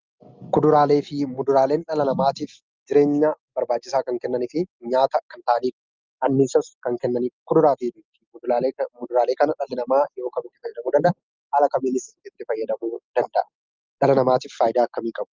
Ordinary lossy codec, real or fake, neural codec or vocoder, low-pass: Opus, 24 kbps; real; none; 7.2 kHz